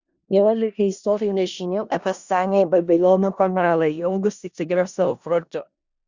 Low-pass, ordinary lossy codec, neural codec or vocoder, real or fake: 7.2 kHz; Opus, 64 kbps; codec, 16 kHz in and 24 kHz out, 0.4 kbps, LongCat-Audio-Codec, four codebook decoder; fake